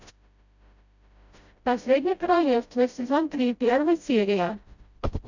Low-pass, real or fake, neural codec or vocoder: 7.2 kHz; fake; codec, 16 kHz, 0.5 kbps, FreqCodec, smaller model